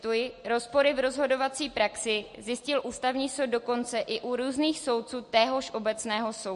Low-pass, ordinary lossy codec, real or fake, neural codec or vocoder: 14.4 kHz; MP3, 48 kbps; real; none